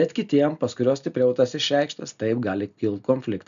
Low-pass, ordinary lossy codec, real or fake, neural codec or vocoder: 7.2 kHz; MP3, 96 kbps; real; none